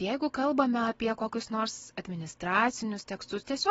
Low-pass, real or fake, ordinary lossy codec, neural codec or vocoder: 19.8 kHz; real; AAC, 24 kbps; none